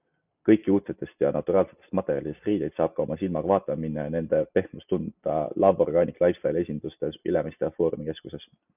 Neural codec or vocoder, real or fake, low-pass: none; real; 3.6 kHz